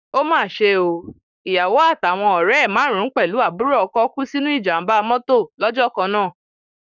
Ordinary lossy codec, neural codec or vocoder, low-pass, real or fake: none; codec, 44.1 kHz, 7.8 kbps, Pupu-Codec; 7.2 kHz; fake